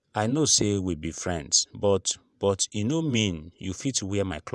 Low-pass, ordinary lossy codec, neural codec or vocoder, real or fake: none; none; vocoder, 24 kHz, 100 mel bands, Vocos; fake